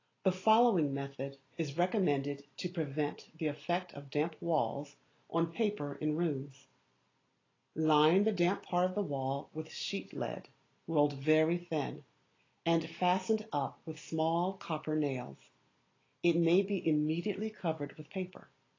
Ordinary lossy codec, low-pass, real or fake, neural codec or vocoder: AAC, 32 kbps; 7.2 kHz; real; none